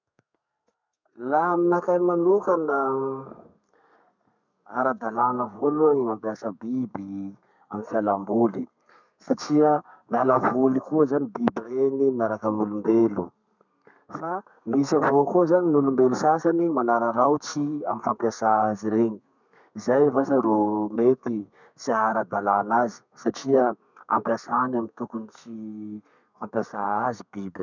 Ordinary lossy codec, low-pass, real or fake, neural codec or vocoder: none; 7.2 kHz; fake; codec, 32 kHz, 1.9 kbps, SNAC